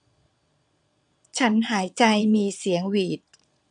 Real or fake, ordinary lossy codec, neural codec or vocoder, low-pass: fake; none; vocoder, 22.05 kHz, 80 mel bands, Vocos; 9.9 kHz